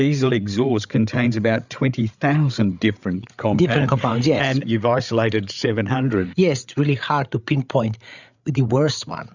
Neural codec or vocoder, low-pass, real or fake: codec, 16 kHz, 8 kbps, FreqCodec, larger model; 7.2 kHz; fake